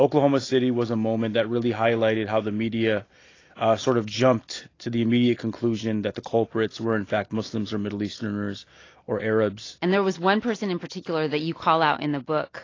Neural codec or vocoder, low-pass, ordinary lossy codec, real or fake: none; 7.2 kHz; AAC, 32 kbps; real